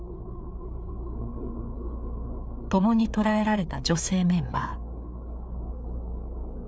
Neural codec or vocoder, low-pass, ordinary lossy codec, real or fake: codec, 16 kHz, 4 kbps, FreqCodec, larger model; none; none; fake